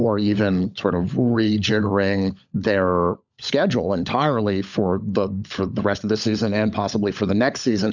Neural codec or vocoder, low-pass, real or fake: codec, 16 kHz, 16 kbps, FunCodec, trained on LibriTTS, 50 frames a second; 7.2 kHz; fake